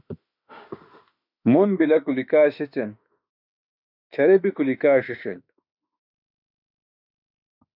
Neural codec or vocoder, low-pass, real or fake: autoencoder, 48 kHz, 32 numbers a frame, DAC-VAE, trained on Japanese speech; 5.4 kHz; fake